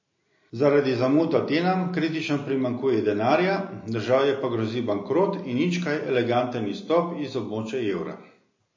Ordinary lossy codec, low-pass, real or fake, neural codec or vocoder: MP3, 32 kbps; 7.2 kHz; real; none